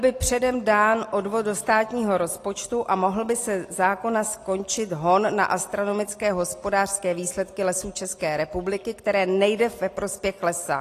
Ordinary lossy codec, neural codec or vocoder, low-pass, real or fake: AAC, 48 kbps; none; 14.4 kHz; real